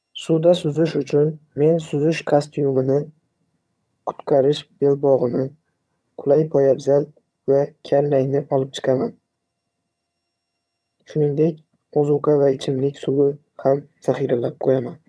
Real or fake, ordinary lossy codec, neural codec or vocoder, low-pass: fake; none; vocoder, 22.05 kHz, 80 mel bands, HiFi-GAN; none